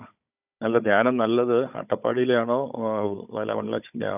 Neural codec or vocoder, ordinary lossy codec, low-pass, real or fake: codec, 16 kHz, 16 kbps, FunCodec, trained on Chinese and English, 50 frames a second; none; 3.6 kHz; fake